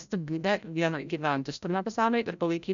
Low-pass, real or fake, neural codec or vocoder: 7.2 kHz; fake; codec, 16 kHz, 0.5 kbps, FreqCodec, larger model